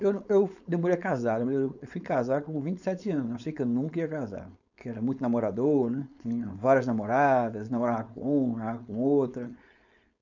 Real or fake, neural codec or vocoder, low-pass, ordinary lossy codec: fake; codec, 16 kHz, 4.8 kbps, FACodec; 7.2 kHz; none